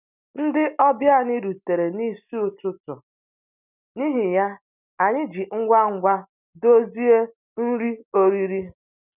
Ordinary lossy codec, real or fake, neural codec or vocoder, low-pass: none; real; none; 3.6 kHz